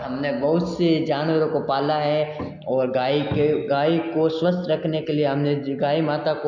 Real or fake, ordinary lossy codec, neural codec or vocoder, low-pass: real; MP3, 64 kbps; none; 7.2 kHz